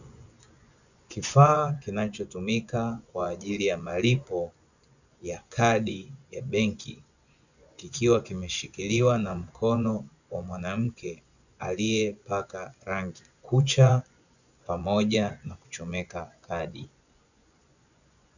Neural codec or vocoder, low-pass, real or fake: vocoder, 24 kHz, 100 mel bands, Vocos; 7.2 kHz; fake